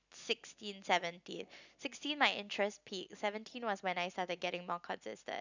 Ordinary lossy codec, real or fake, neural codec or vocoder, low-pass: none; real; none; 7.2 kHz